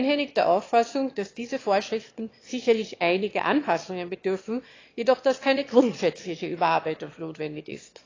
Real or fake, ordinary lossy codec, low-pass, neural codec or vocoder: fake; AAC, 32 kbps; 7.2 kHz; autoencoder, 22.05 kHz, a latent of 192 numbers a frame, VITS, trained on one speaker